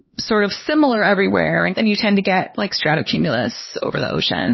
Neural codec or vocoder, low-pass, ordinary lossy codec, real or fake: codec, 16 kHz, 2 kbps, X-Codec, HuBERT features, trained on LibriSpeech; 7.2 kHz; MP3, 24 kbps; fake